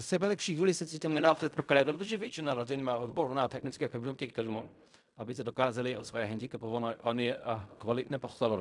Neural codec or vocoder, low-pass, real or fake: codec, 16 kHz in and 24 kHz out, 0.4 kbps, LongCat-Audio-Codec, fine tuned four codebook decoder; 10.8 kHz; fake